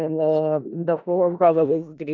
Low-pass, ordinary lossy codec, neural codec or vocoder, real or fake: 7.2 kHz; none; codec, 16 kHz in and 24 kHz out, 0.4 kbps, LongCat-Audio-Codec, four codebook decoder; fake